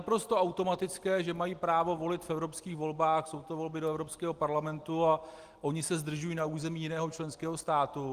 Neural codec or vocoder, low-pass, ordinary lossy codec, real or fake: none; 14.4 kHz; Opus, 24 kbps; real